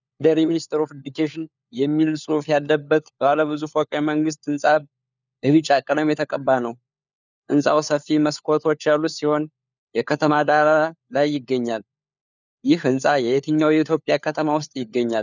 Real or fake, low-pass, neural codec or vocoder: fake; 7.2 kHz; codec, 16 kHz, 4 kbps, FunCodec, trained on LibriTTS, 50 frames a second